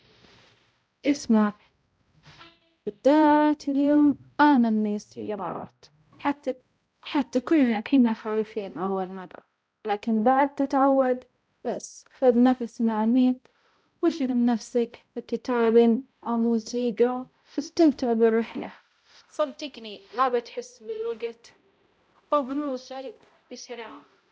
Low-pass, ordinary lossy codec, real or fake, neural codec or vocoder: none; none; fake; codec, 16 kHz, 0.5 kbps, X-Codec, HuBERT features, trained on balanced general audio